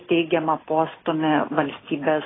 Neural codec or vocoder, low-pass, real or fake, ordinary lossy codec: none; 7.2 kHz; real; AAC, 16 kbps